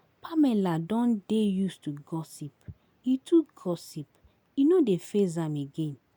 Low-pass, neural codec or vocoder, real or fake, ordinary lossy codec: none; none; real; none